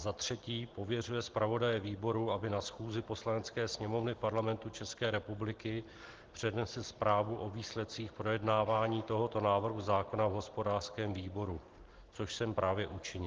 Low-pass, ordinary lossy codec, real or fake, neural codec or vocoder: 7.2 kHz; Opus, 16 kbps; real; none